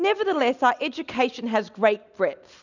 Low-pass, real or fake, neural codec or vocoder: 7.2 kHz; real; none